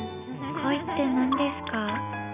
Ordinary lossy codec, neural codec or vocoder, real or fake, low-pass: none; none; real; 3.6 kHz